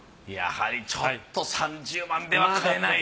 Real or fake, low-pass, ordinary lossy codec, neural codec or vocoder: real; none; none; none